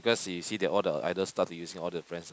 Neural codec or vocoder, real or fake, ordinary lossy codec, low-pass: none; real; none; none